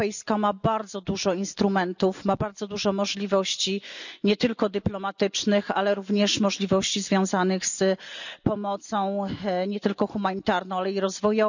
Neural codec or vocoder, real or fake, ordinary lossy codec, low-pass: none; real; none; 7.2 kHz